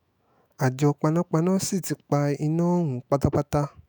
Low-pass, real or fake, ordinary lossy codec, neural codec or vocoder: none; fake; none; autoencoder, 48 kHz, 128 numbers a frame, DAC-VAE, trained on Japanese speech